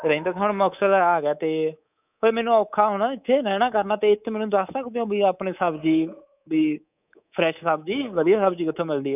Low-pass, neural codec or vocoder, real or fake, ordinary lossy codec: 3.6 kHz; codec, 24 kHz, 3.1 kbps, DualCodec; fake; none